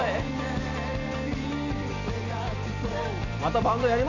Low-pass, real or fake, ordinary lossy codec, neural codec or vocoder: 7.2 kHz; real; none; none